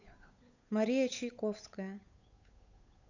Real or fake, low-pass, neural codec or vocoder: fake; 7.2 kHz; codec, 16 kHz, 8 kbps, FunCodec, trained on Chinese and English, 25 frames a second